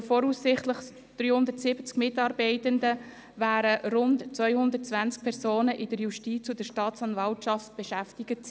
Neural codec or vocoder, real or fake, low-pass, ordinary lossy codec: none; real; none; none